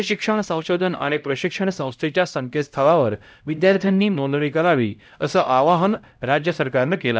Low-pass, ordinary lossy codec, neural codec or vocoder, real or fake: none; none; codec, 16 kHz, 0.5 kbps, X-Codec, HuBERT features, trained on LibriSpeech; fake